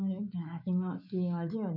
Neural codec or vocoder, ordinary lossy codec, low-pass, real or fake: autoencoder, 48 kHz, 128 numbers a frame, DAC-VAE, trained on Japanese speech; none; 5.4 kHz; fake